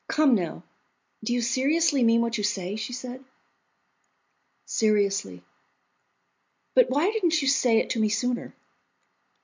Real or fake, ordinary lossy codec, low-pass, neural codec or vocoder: real; MP3, 64 kbps; 7.2 kHz; none